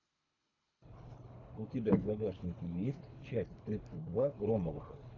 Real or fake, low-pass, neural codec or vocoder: fake; 7.2 kHz; codec, 24 kHz, 3 kbps, HILCodec